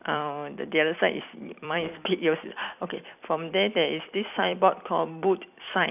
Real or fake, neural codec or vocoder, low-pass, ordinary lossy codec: fake; vocoder, 44.1 kHz, 128 mel bands every 256 samples, BigVGAN v2; 3.6 kHz; none